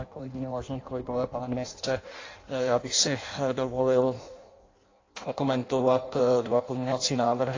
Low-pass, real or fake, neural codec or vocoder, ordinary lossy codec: 7.2 kHz; fake; codec, 16 kHz in and 24 kHz out, 0.6 kbps, FireRedTTS-2 codec; AAC, 32 kbps